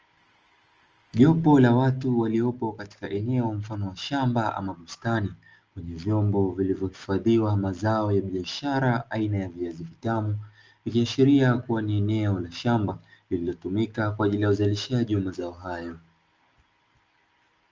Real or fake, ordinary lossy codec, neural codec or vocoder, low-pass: real; Opus, 24 kbps; none; 7.2 kHz